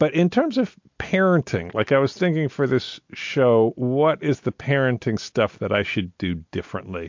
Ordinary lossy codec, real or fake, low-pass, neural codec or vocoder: MP3, 48 kbps; real; 7.2 kHz; none